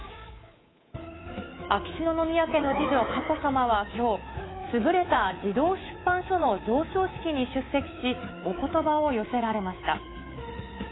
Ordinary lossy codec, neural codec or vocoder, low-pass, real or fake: AAC, 16 kbps; codec, 24 kHz, 3.1 kbps, DualCodec; 7.2 kHz; fake